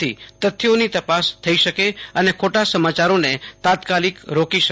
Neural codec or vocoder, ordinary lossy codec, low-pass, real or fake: none; none; none; real